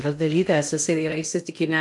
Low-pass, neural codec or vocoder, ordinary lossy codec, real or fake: 10.8 kHz; codec, 16 kHz in and 24 kHz out, 0.6 kbps, FocalCodec, streaming, 2048 codes; MP3, 64 kbps; fake